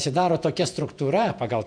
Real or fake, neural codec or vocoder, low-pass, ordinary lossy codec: fake; vocoder, 48 kHz, 128 mel bands, Vocos; 9.9 kHz; AAC, 64 kbps